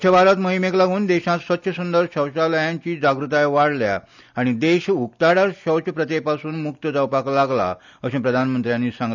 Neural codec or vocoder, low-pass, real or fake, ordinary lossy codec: none; 7.2 kHz; real; none